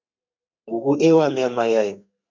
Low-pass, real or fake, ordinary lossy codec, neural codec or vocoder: 7.2 kHz; fake; MP3, 48 kbps; codec, 32 kHz, 1.9 kbps, SNAC